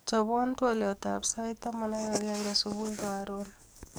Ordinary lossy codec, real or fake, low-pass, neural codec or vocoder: none; fake; none; codec, 44.1 kHz, 7.8 kbps, DAC